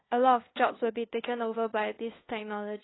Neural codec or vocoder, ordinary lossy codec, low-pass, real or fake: codec, 16 kHz, 2 kbps, FunCodec, trained on LibriTTS, 25 frames a second; AAC, 16 kbps; 7.2 kHz; fake